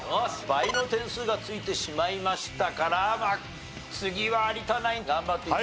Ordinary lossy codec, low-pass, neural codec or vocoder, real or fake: none; none; none; real